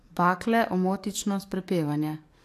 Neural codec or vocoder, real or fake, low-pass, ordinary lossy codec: codec, 44.1 kHz, 7.8 kbps, DAC; fake; 14.4 kHz; AAC, 64 kbps